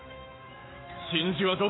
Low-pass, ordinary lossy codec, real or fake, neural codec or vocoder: 7.2 kHz; AAC, 16 kbps; fake; autoencoder, 48 kHz, 128 numbers a frame, DAC-VAE, trained on Japanese speech